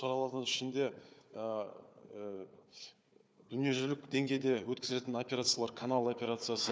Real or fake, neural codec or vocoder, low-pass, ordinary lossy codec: fake; codec, 16 kHz, 4 kbps, FunCodec, trained on Chinese and English, 50 frames a second; none; none